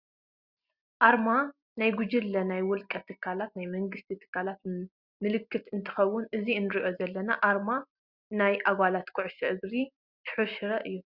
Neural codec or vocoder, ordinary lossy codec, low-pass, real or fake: none; Opus, 64 kbps; 5.4 kHz; real